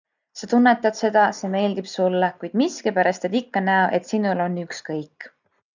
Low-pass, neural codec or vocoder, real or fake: 7.2 kHz; none; real